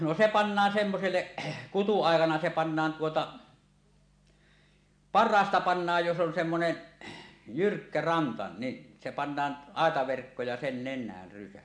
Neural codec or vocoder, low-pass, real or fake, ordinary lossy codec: none; 9.9 kHz; real; AAC, 64 kbps